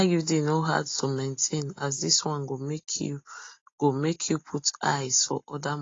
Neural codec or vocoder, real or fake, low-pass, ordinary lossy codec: none; real; 7.2 kHz; AAC, 32 kbps